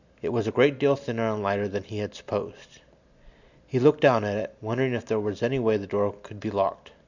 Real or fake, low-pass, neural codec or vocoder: real; 7.2 kHz; none